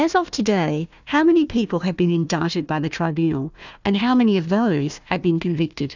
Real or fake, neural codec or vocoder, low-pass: fake; codec, 16 kHz, 1 kbps, FunCodec, trained on Chinese and English, 50 frames a second; 7.2 kHz